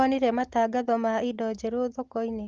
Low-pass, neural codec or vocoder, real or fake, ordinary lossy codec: 7.2 kHz; none; real; Opus, 16 kbps